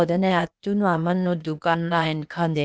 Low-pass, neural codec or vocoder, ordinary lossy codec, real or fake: none; codec, 16 kHz, 0.8 kbps, ZipCodec; none; fake